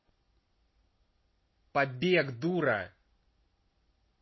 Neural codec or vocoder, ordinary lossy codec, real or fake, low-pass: none; MP3, 24 kbps; real; 7.2 kHz